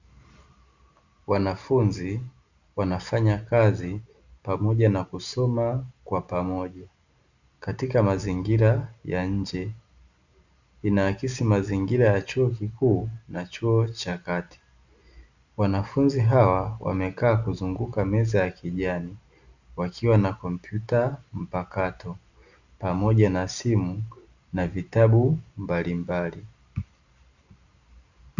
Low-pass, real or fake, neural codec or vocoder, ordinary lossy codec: 7.2 kHz; real; none; Opus, 64 kbps